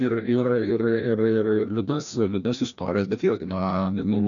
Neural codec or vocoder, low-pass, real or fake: codec, 16 kHz, 1 kbps, FreqCodec, larger model; 7.2 kHz; fake